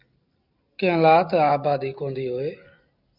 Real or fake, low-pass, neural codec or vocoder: real; 5.4 kHz; none